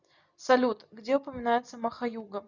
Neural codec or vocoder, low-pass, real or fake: none; 7.2 kHz; real